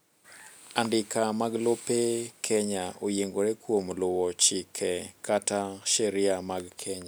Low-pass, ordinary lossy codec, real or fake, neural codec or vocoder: none; none; real; none